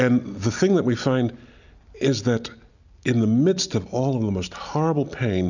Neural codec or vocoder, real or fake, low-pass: none; real; 7.2 kHz